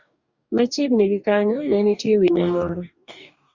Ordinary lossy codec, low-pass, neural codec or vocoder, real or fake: Opus, 64 kbps; 7.2 kHz; codec, 44.1 kHz, 2.6 kbps, DAC; fake